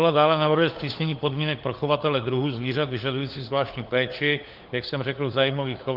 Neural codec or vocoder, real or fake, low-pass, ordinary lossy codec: codec, 16 kHz, 4 kbps, FunCodec, trained on LibriTTS, 50 frames a second; fake; 5.4 kHz; Opus, 32 kbps